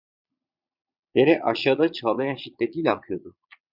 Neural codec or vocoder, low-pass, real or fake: vocoder, 22.05 kHz, 80 mel bands, Vocos; 5.4 kHz; fake